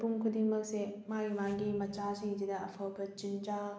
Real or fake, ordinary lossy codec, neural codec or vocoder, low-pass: real; none; none; none